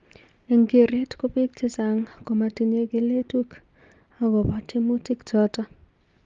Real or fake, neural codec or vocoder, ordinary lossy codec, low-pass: real; none; Opus, 32 kbps; 7.2 kHz